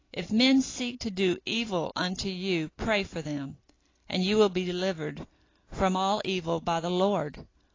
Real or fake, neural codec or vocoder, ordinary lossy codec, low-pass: real; none; AAC, 32 kbps; 7.2 kHz